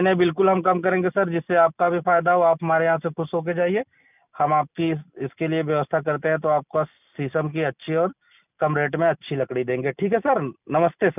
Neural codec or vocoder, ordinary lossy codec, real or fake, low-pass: none; none; real; 3.6 kHz